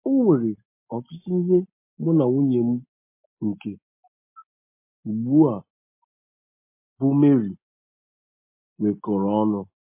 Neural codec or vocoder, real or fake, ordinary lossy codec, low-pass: none; real; MP3, 24 kbps; 3.6 kHz